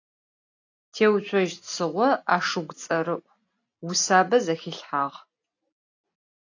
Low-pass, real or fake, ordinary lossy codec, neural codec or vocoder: 7.2 kHz; real; AAC, 48 kbps; none